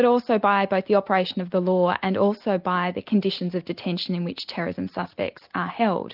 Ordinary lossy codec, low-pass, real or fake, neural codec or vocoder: Opus, 24 kbps; 5.4 kHz; real; none